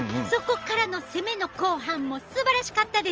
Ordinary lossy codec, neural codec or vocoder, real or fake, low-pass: Opus, 24 kbps; none; real; 7.2 kHz